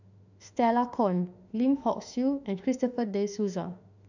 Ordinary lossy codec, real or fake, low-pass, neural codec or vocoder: none; fake; 7.2 kHz; autoencoder, 48 kHz, 32 numbers a frame, DAC-VAE, trained on Japanese speech